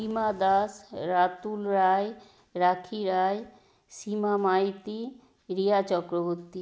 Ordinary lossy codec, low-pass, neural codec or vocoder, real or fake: none; none; none; real